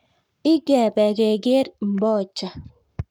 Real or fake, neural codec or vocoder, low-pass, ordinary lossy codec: fake; codec, 44.1 kHz, 7.8 kbps, Pupu-Codec; 19.8 kHz; none